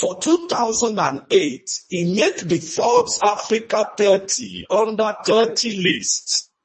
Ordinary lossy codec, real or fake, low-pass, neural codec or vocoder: MP3, 32 kbps; fake; 10.8 kHz; codec, 24 kHz, 1.5 kbps, HILCodec